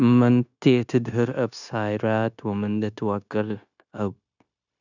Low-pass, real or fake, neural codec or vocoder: 7.2 kHz; fake; codec, 16 kHz, 0.9 kbps, LongCat-Audio-Codec